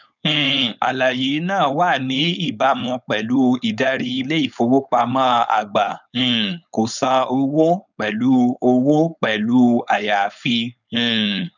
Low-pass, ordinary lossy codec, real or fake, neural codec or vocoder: 7.2 kHz; none; fake; codec, 16 kHz, 4.8 kbps, FACodec